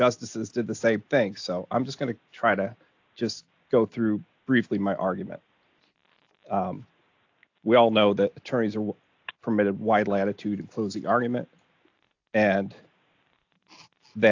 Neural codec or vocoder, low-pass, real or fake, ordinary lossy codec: none; 7.2 kHz; real; AAC, 48 kbps